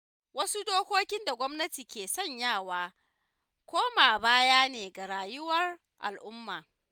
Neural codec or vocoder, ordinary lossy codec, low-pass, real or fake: none; none; none; real